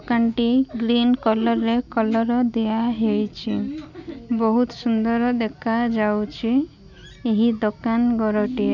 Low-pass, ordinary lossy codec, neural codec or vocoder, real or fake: 7.2 kHz; none; none; real